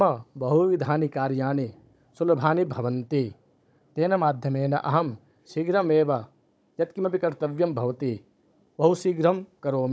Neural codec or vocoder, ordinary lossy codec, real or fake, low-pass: codec, 16 kHz, 16 kbps, FunCodec, trained on Chinese and English, 50 frames a second; none; fake; none